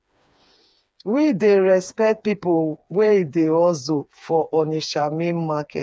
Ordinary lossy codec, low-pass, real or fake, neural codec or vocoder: none; none; fake; codec, 16 kHz, 4 kbps, FreqCodec, smaller model